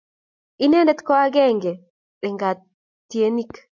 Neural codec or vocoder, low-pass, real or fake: none; 7.2 kHz; real